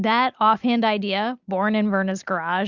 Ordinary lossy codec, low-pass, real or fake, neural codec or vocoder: Opus, 64 kbps; 7.2 kHz; real; none